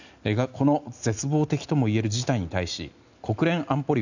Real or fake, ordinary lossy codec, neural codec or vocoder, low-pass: real; none; none; 7.2 kHz